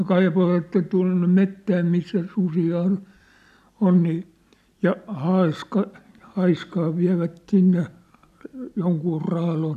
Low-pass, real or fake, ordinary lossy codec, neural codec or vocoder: 14.4 kHz; real; none; none